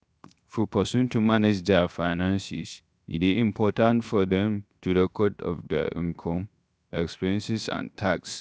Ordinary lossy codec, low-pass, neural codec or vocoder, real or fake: none; none; codec, 16 kHz, 0.7 kbps, FocalCodec; fake